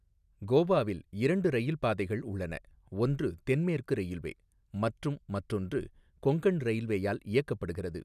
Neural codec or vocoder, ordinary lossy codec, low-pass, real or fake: none; none; 14.4 kHz; real